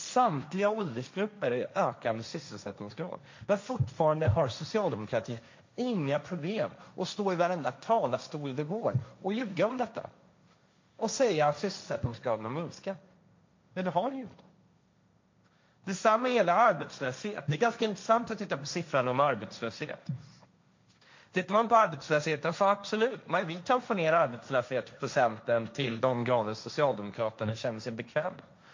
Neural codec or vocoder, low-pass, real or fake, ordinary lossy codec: codec, 16 kHz, 1.1 kbps, Voila-Tokenizer; 7.2 kHz; fake; MP3, 48 kbps